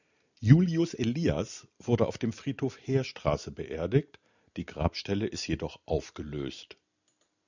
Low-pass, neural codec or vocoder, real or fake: 7.2 kHz; none; real